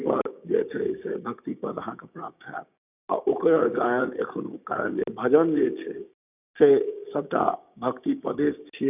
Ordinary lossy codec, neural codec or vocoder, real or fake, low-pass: none; codec, 16 kHz, 6 kbps, DAC; fake; 3.6 kHz